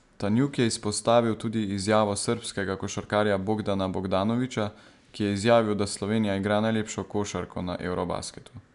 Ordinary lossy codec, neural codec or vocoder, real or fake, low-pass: MP3, 96 kbps; none; real; 10.8 kHz